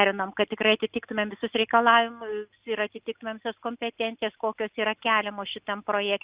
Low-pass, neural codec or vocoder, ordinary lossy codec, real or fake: 3.6 kHz; none; Opus, 64 kbps; real